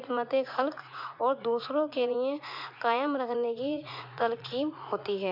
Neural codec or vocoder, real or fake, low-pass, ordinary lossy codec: vocoder, 44.1 kHz, 80 mel bands, Vocos; fake; 5.4 kHz; MP3, 48 kbps